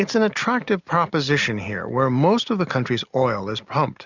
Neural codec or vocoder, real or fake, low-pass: none; real; 7.2 kHz